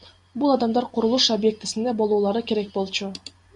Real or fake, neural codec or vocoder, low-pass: real; none; 10.8 kHz